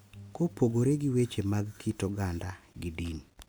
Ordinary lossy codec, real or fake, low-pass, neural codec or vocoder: none; real; none; none